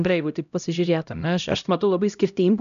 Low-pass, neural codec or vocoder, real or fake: 7.2 kHz; codec, 16 kHz, 0.5 kbps, X-Codec, HuBERT features, trained on LibriSpeech; fake